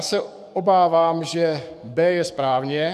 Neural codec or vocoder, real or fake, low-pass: codec, 44.1 kHz, 7.8 kbps, DAC; fake; 14.4 kHz